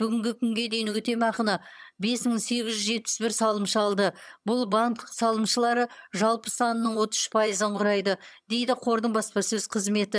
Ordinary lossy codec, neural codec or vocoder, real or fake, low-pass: none; vocoder, 22.05 kHz, 80 mel bands, HiFi-GAN; fake; none